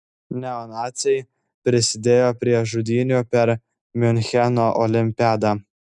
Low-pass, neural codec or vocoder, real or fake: 10.8 kHz; none; real